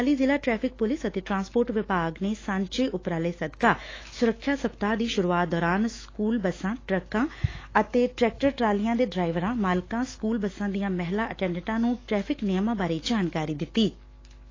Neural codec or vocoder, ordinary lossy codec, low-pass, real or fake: autoencoder, 48 kHz, 128 numbers a frame, DAC-VAE, trained on Japanese speech; AAC, 32 kbps; 7.2 kHz; fake